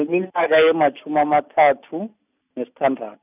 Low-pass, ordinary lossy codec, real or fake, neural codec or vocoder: 3.6 kHz; none; real; none